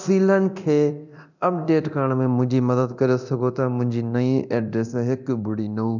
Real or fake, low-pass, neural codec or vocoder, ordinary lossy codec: fake; 7.2 kHz; codec, 24 kHz, 0.9 kbps, DualCodec; none